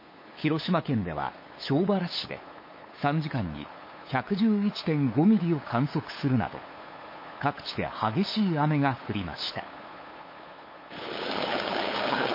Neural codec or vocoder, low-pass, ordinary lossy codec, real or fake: codec, 16 kHz, 8 kbps, FunCodec, trained on LibriTTS, 25 frames a second; 5.4 kHz; MP3, 24 kbps; fake